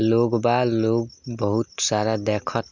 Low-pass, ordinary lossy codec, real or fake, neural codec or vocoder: 7.2 kHz; none; real; none